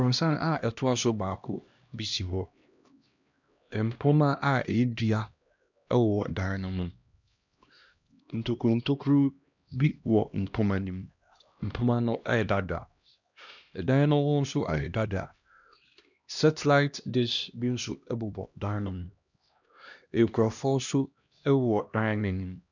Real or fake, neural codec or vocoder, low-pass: fake; codec, 16 kHz, 1 kbps, X-Codec, HuBERT features, trained on LibriSpeech; 7.2 kHz